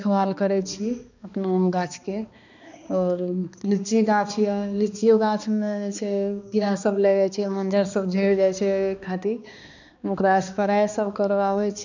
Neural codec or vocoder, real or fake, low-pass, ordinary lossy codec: codec, 16 kHz, 2 kbps, X-Codec, HuBERT features, trained on balanced general audio; fake; 7.2 kHz; none